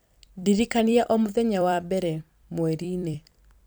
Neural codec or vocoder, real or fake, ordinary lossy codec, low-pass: vocoder, 44.1 kHz, 128 mel bands every 256 samples, BigVGAN v2; fake; none; none